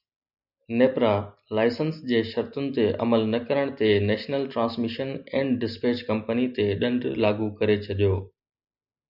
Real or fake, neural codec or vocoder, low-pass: real; none; 5.4 kHz